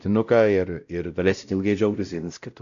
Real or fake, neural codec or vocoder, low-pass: fake; codec, 16 kHz, 0.5 kbps, X-Codec, WavLM features, trained on Multilingual LibriSpeech; 7.2 kHz